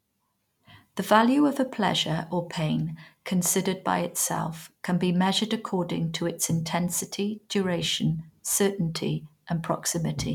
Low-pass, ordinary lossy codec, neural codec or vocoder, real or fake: 19.8 kHz; none; none; real